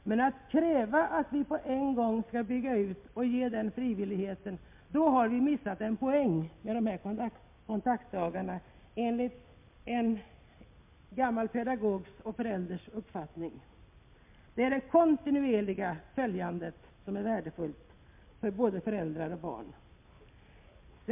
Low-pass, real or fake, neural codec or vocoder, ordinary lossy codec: 3.6 kHz; real; none; MP3, 32 kbps